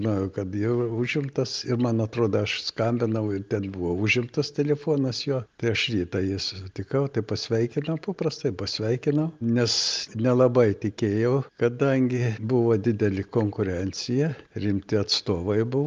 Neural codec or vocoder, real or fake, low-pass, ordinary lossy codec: none; real; 7.2 kHz; Opus, 32 kbps